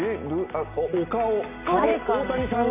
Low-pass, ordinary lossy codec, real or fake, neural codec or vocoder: 3.6 kHz; none; real; none